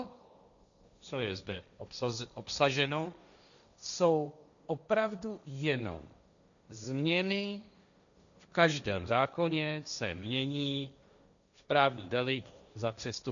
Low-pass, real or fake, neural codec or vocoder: 7.2 kHz; fake; codec, 16 kHz, 1.1 kbps, Voila-Tokenizer